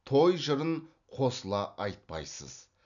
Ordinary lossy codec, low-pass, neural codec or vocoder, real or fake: none; 7.2 kHz; none; real